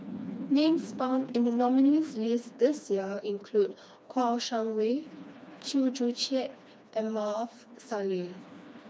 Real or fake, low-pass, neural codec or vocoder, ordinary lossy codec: fake; none; codec, 16 kHz, 2 kbps, FreqCodec, smaller model; none